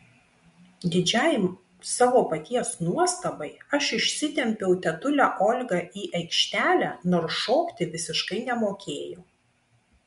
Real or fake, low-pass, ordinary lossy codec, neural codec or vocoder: real; 10.8 kHz; MP3, 64 kbps; none